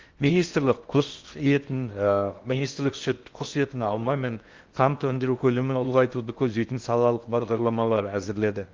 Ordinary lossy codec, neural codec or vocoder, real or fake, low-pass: Opus, 32 kbps; codec, 16 kHz in and 24 kHz out, 0.6 kbps, FocalCodec, streaming, 4096 codes; fake; 7.2 kHz